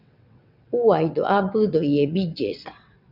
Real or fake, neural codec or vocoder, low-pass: fake; vocoder, 22.05 kHz, 80 mel bands, WaveNeXt; 5.4 kHz